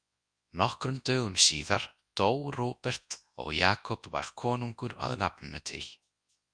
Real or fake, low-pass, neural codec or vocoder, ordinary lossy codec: fake; 9.9 kHz; codec, 24 kHz, 0.9 kbps, WavTokenizer, large speech release; Opus, 64 kbps